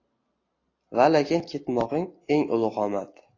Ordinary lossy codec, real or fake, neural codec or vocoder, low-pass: AAC, 32 kbps; real; none; 7.2 kHz